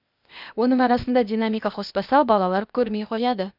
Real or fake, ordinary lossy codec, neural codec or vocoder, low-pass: fake; none; codec, 16 kHz, 0.8 kbps, ZipCodec; 5.4 kHz